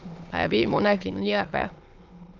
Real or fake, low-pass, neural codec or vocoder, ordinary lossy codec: fake; 7.2 kHz; autoencoder, 22.05 kHz, a latent of 192 numbers a frame, VITS, trained on many speakers; Opus, 24 kbps